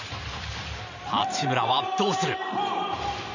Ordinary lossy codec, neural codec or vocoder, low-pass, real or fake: none; vocoder, 44.1 kHz, 128 mel bands every 512 samples, BigVGAN v2; 7.2 kHz; fake